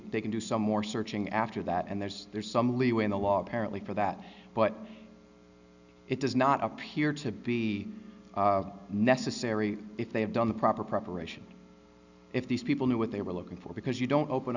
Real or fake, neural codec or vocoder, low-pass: real; none; 7.2 kHz